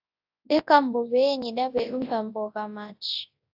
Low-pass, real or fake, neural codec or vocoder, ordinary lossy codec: 5.4 kHz; fake; codec, 24 kHz, 0.9 kbps, WavTokenizer, large speech release; AAC, 48 kbps